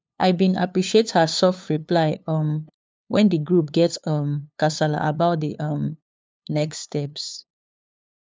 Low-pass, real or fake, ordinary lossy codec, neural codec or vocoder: none; fake; none; codec, 16 kHz, 2 kbps, FunCodec, trained on LibriTTS, 25 frames a second